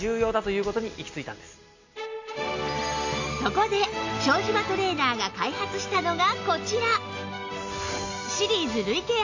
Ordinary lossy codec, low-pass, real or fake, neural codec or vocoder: AAC, 48 kbps; 7.2 kHz; real; none